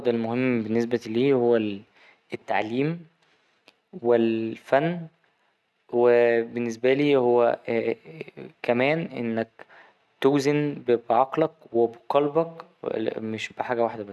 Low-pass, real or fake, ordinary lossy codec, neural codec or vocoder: none; real; none; none